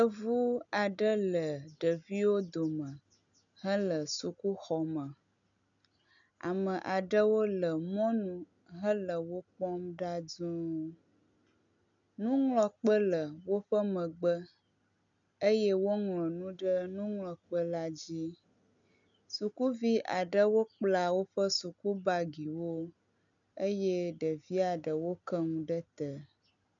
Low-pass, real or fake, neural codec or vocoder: 7.2 kHz; real; none